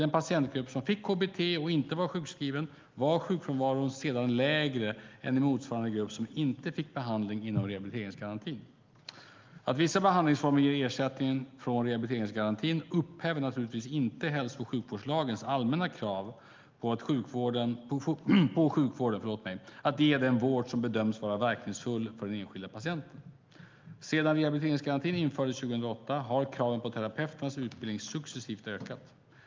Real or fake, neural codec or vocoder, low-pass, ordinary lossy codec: real; none; 7.2 kHz; Opus, 24 kbps